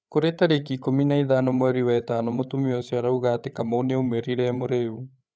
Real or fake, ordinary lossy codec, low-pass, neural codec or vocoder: fake; none; none; codec, 16 kHz, 8 kbps, FreqCodec, larger model